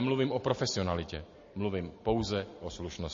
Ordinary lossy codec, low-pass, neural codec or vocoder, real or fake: MP3, 32 kbps; 7.2 kHz; none; real